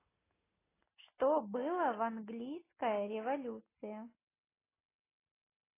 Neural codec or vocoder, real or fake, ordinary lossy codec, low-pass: none; real; AAC, 16 kbps; 3.6 kHz